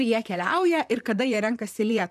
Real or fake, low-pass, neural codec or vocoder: fake; 14.4 kHz; vocoder, 44.1 kHz, 128 mel bands, Pupu-Vocoder